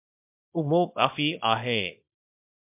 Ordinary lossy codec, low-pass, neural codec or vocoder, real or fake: MP3, 32 kbps; 3.6 kHz; codec, 24 kHz, 0.9 kbps, WavTokenizer, small release; fake